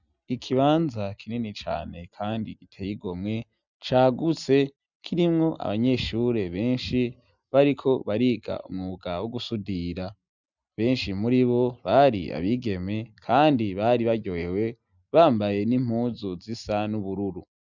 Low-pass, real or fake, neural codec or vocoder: 7.2 kHz; real; none